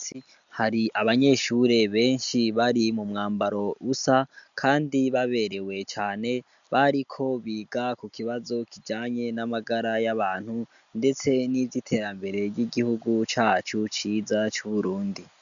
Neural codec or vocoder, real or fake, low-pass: none; real; 7.2 kHz